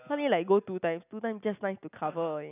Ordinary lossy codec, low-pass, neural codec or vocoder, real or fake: none; 3.6 kHz; none; real